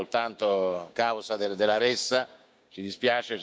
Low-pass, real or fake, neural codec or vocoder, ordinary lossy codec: none; fake; codec, 16 kHz, 6 kbps, DAC; none